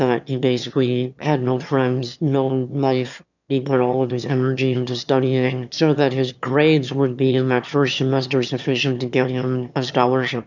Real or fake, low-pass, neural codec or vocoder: fake; 7.2 kHz; autoencoder, 22.05 kHz, a latent of 192 numbers a frame, VITS, trained on one speaker